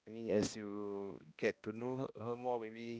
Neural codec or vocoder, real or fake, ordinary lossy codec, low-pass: codec, 16 kHz, 1 kbps, X-Codec, HuBERT features, trained on balanced general audio; fake; none; none